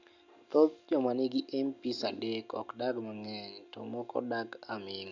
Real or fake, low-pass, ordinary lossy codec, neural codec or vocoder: real; 7.2 kHz; none; none